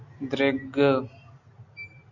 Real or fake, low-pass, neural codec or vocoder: real; 7.2 kHz; none